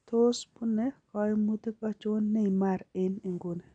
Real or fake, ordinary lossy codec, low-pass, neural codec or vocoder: real; none; 9.9 kHz; none